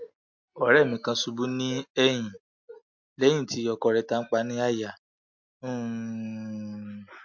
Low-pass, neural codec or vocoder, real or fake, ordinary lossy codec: 7.2 kHz; none; real; MP3, 48 kbps